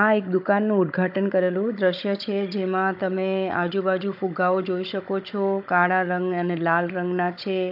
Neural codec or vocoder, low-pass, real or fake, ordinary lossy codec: codec, 16 kHz, 16 kbps, FunCodec, trained on Chinese and English, 50 frames a second; 5.4 kHz; fake; AAC, 48 kbps